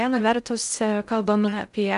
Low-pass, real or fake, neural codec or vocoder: 10.8 kHz; fake; codec, 16 kHz in and 24 kHz out, 0.6 kbps, FocalCodec, streaming, 2048 codes